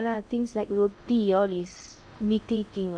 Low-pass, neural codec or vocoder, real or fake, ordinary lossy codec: 9.9 kHz; codec, 16 kHz in and 24 kHz out, 0.6 kbps, FocalCodec, streaming, 2048 codes; fake; none